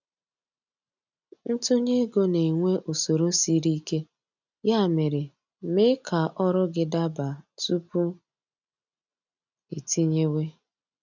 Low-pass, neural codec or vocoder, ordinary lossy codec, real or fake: 7.2 kHz; none; none; real